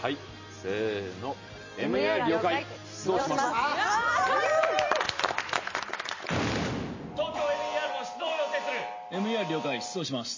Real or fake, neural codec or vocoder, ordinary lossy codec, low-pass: real; none; MP3, 32 kbps; 7.2 kHz